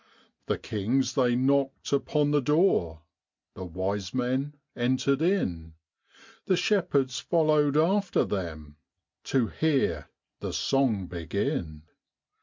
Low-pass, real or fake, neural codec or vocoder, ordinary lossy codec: 7.2 kHz; real; none; MP3, 64 kbps